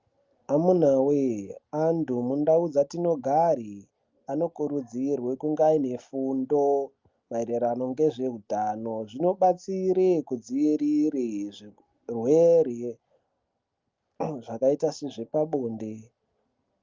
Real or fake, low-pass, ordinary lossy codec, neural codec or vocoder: real; 7.2 kHz; Opus, 24 kbps; none